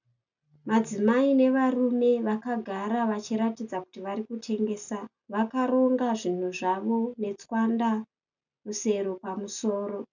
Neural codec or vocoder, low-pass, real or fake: none; 7.2 kHz; real